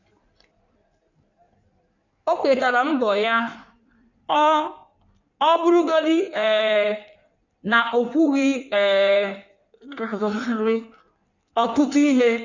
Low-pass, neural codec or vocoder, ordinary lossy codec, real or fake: 7.2 kHz; codec, 16 kHz in and 24 kHz out, 1.1 kbps, FireRedTTS-2 codec; none; fake